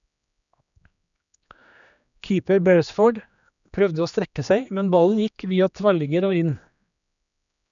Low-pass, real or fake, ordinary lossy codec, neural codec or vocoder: 7.2 kHz; fake; none; codec, 16 kHz, 2 kbps, X-Codec, HuBERT features, trained on general audio